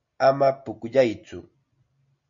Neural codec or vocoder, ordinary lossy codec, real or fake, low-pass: none; MP3, 48 kbps; real; 7.2 kHz